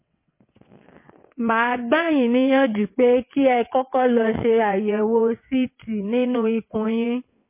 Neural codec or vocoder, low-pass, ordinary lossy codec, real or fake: vocoder, 22.05 kHz, 80 mel bands, WaveNeXt; 3.6 kHz; MP3, 24 kbps; fake